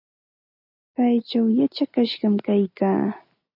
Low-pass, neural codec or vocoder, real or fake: 5.4 kHz; none; real